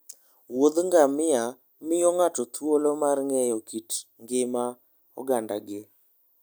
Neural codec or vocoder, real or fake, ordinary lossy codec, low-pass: none; real; none; none